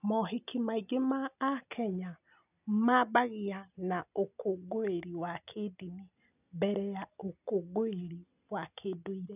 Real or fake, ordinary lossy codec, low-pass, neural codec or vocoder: real; none; 3.6 kHz; none